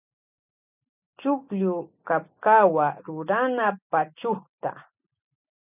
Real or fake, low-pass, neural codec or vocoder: real; 3.6 kHz; none